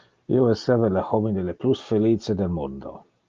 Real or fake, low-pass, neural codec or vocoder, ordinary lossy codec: real; 7.2 kHz; none; Opus, 24 kbps